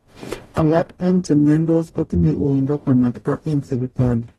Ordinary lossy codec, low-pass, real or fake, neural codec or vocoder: AAC, 32 kbps; 19.8 kHz; fake; codec, 44.1 kHz, 0.9 kbps, DAC